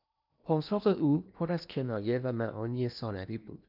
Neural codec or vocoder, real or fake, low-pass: codec, 16 kHz in and 24 kHz out, 0.8 kbps, FocalCodec, streaming, 65536 codes; fake; 5.4 kHz